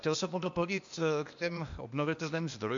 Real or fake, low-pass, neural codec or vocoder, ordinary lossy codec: fake; 7.2 kHz; codec, 16 kHz, 0.8 kbps, ZipCodec; AAC, 64 kbps